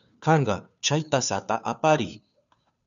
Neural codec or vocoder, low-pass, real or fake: codec, 16 kHz, 2 kbps, FunCodec, trained on Chinese and English, 25 frames a second; 7.2 kHz; fake